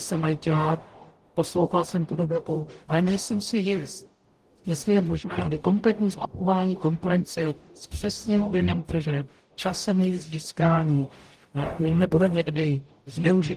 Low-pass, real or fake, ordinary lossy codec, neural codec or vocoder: 14.4 kHz; fake; Opus, 24 kbps; codec, 44.1 kHz, 0.9 kbps, DAC